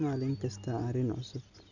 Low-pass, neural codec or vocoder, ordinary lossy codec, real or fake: 7.2 kHz; none; none; real